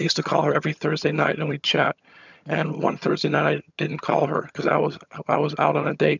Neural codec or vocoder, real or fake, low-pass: vocoder, 22.05 kHz, 80 mel bands, HiFi-GAN; fake; 7.2 kHz